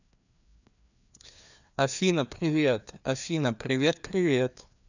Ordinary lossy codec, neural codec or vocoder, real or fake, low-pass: none; codec, 16 kHz, 2 kbps, FreqCodec, larger model; fake; 7.2 kHz